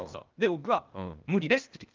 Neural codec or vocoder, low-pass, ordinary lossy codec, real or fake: codec, 16 kHz, 0.8 kbps, ZipCodec; 7.2 kHz; Opus, 32 kbps; fake